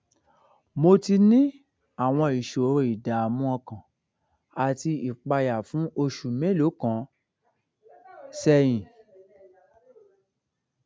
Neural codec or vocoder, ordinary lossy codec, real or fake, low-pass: none; none; real; none